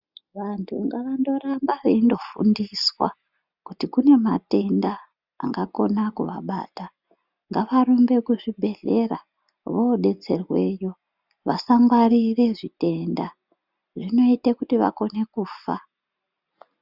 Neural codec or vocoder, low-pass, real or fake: none; 5.4 kHz; real